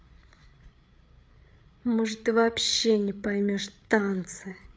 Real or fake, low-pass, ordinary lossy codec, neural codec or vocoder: fake; none; none; codec, 16 kHz, 8 kbps, FreqCodec, larger model